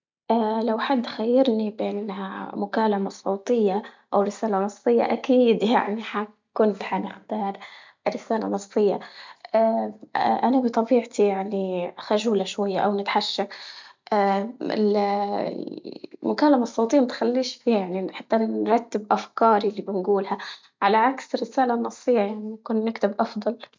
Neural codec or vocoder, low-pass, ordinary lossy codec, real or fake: none; 7.2 kHz; MP3, 64 kbps; real